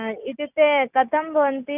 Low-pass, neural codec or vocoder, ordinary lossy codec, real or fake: 3.6 kHz; none; none; real